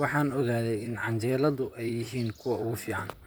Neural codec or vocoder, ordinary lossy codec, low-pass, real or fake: vocoder, 44.1 kHz, 128 mel bands, Pupu-Vocoder; none; none; fake